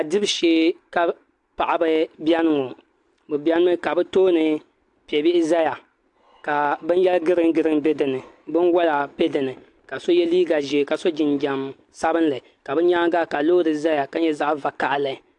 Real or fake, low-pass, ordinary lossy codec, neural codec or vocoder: real; 10.8 kHz; AAC, 64 kbps; none